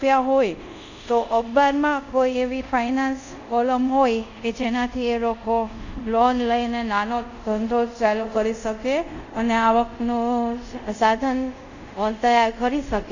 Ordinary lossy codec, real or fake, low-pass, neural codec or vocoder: none; fake; 7.2 kHz; codec, 24 kHz, 0.5 kbps, DualCodec